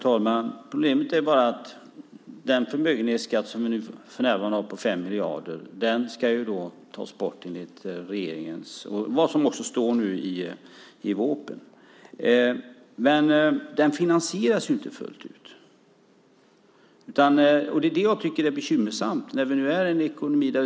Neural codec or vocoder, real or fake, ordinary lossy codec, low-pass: none; real; none; none